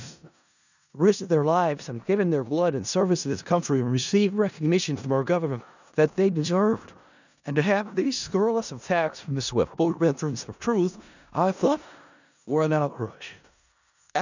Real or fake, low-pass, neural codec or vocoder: fake; 7.2 kHz; codec, 16 kHz in and 24 kHz out, 0.4 kbps, LongCat-Audio-Codec, four codebook decoder